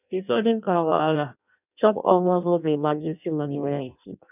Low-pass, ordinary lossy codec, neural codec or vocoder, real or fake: 3.6 kHz; none; codec, 16 kHz in and 24 kHz out, 0.6 kbps, FireRedTTS-2 codec; fake